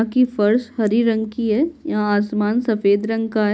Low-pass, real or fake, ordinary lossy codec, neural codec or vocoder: none; real; none; none